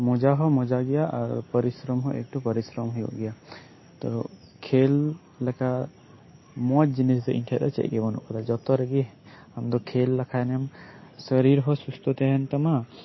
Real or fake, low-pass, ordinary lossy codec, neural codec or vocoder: real; 7.2 kHz; MP3, 24 kbps; none